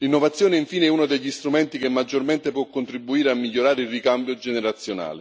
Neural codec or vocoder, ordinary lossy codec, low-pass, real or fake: none; none; none; real